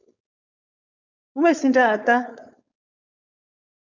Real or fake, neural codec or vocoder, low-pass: fake; codec, 16 kHz, 4.8 kbps, FACodec; 7.2 kHz